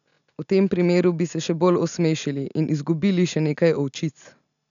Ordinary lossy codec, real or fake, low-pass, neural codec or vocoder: none; real; 7.2 kHz; none